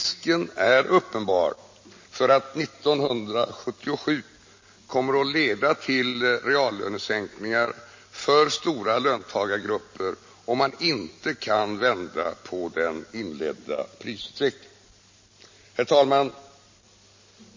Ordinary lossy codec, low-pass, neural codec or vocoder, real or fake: MP3, 32 kbps; 7.2 kHz; none; real